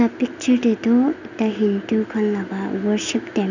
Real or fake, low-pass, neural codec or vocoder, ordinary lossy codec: real; 7.2 kHz; none; none